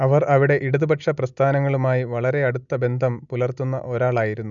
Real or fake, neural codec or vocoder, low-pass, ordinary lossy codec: real; none; 7.2 kHz; none